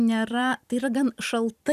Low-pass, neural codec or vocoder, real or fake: 14.4 kHz; vocoder, 44.1 kHz, 128 mel bands every 256 samples, BigVGAN v2; fake